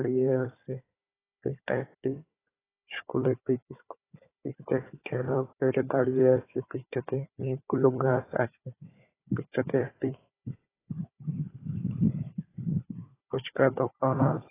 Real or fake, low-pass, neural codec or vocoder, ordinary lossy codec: fake; 3.6 kHz; codec, 16 kHz, 4 kbps, FunCodec, trained on Chinese and English, 50 frames a second; AAC, 16 kbps